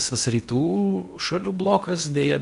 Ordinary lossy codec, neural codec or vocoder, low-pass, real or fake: MP3, 96 kbps; codec, 16 kHz in and 24 kHz out, 0.8 kbps, FocalCodec, streaming, 65536 codes; 10.8 kHz; fake